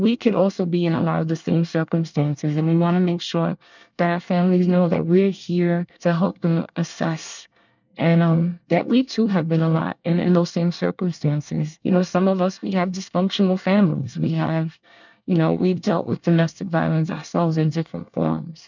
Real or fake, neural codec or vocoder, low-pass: fake; codec, 24 kHz, 1 kbps, SNAC; 7.2 kHz